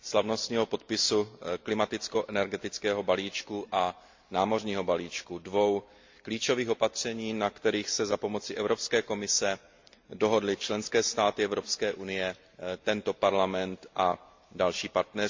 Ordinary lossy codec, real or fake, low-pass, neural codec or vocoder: MP3, 48 kbps; real; 7.2 kHz; none